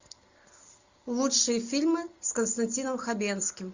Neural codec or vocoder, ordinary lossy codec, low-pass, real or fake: none; Opus, 64 kbps; 7.2 kHz; real